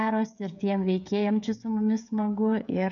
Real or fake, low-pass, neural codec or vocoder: fake; 7.2 kHz; codec, 16 kHz, 16 kbps, FreqCodec, smaller model